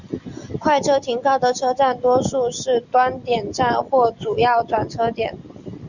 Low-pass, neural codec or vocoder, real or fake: 7.2 kHz; none; real